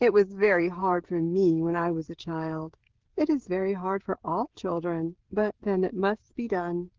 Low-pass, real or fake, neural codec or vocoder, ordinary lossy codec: 7.2 kHz; fake; codec, 16 kHz, 16 kbps, FreqCodec, smaller model; Opus, 16 kbps